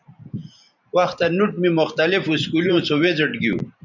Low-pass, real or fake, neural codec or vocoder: 7.2 kHz; fake; vocoder, 44.1 kHz, 128 mel bands every 512 samples, BigVGAN v2